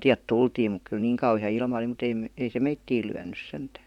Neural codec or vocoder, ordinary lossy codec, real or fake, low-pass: vocoder, 44.1 kHz, 128 mel bands, Pupu-Vocoder; none; fake; 19.8 kHz